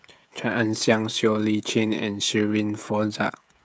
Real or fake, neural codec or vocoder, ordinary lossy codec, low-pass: fake; codec, 16 kHz, 16 kbps, FreqCodec, smaller model; none; none